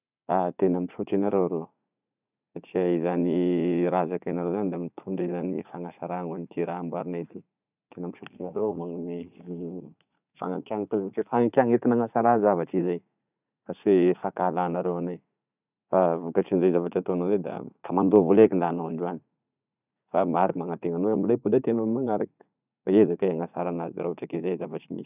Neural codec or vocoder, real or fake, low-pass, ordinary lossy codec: vocoder, 44.1 kHz, 80 mel bands, Vocos; fake; 3.6 kHz; none